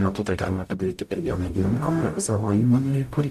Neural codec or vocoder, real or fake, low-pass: codec, 44.1 kHz, 0.9 kbps, DAC; fake; 14.4 kHz